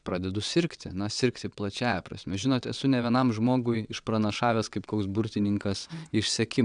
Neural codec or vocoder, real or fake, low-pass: vocoder, 22.05 kHz, 80 mel bands, WaveNeXt; fake; 9.9 kHz